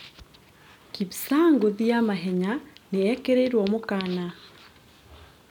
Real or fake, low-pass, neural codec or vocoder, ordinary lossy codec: real; 19.8 kHz; none; none